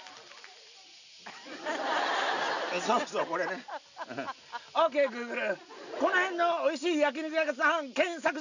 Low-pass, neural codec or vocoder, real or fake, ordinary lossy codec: 7.2 kHz; none; real; none